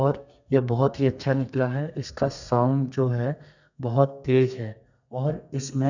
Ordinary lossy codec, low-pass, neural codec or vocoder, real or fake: none; 7.2 kHz; codec, 32 kHz, 1.9 kbps, SNAC; fake